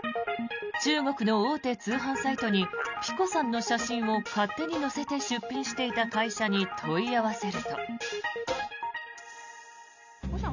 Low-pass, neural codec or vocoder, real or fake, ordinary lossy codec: 7.2 kHz; none; real; none